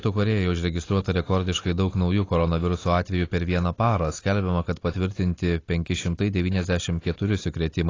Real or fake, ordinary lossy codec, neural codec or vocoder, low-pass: real; AAC, 32 kbps; none; 7.2 kHz